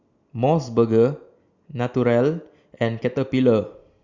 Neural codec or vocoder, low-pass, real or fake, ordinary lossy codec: none; 7.2 kHz; real; Opus, 64 kbps